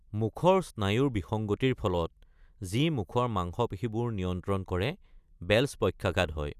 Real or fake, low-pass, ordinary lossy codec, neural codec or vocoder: real; 14.4 kHz; none; none